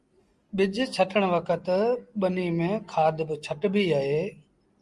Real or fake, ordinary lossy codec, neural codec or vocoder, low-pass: real; Opus, 32 kbps; none; 10.8 kHz